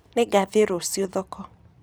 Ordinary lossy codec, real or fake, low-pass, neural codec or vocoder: none; fake; none; vocoder, 44.1 kHz, 128 mel bands every 256 samples, BigVGAN v2